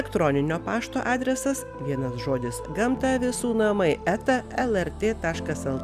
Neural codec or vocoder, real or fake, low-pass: none; real; 14.4 kHz